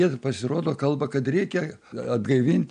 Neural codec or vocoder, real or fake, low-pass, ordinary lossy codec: none; real; 9.9 kHz; MP3, 64 kbps